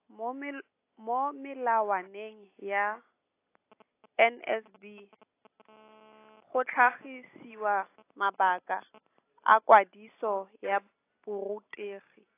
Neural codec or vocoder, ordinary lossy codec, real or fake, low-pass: none; AAC, 24 kbps; real; 3.6 kHz